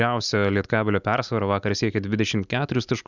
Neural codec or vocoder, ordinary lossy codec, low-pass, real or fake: none; Opus, 64 kbps; 7.2 kHz; real